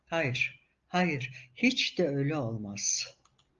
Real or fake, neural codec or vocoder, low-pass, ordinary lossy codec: real; none; 7.2 kHz; Opus, 32 kbps